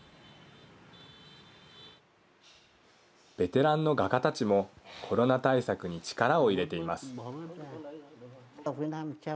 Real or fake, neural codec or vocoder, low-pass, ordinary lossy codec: real; none; none; none